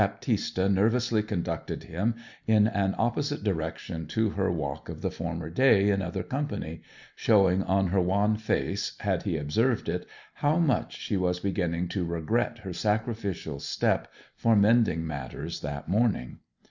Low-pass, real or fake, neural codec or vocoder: 7.2 kHz; real; none